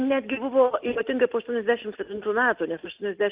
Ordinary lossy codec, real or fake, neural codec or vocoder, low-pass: Opus, 32 kbps; real; none; 3.6 kHz